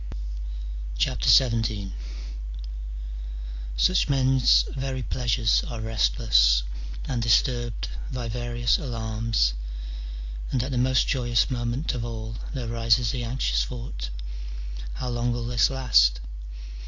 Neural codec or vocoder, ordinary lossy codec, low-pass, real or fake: none; AAC, 48 kbps; 7.2 kHz; real